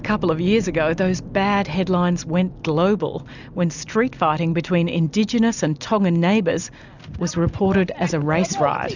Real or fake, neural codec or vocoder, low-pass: real; none; 7.2 kHz